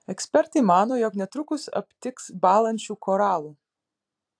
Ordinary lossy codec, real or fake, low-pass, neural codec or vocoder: AAC, 64 kbps; fake; 9.9 kHz; vocoder, 44.1 kHz, 128 mel bands every 256 samples, BigVGAN v2